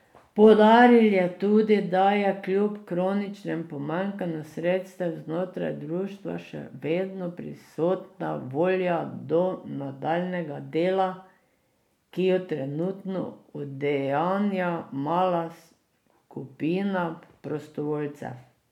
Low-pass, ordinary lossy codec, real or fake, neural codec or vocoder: 19.8 kHz; none; real; none